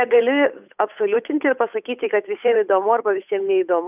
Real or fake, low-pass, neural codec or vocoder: fake; 3.6 kHz; codec, 16 kHz, 8 kbps, FunCodec, trained on Chinese and English, 25 frames a second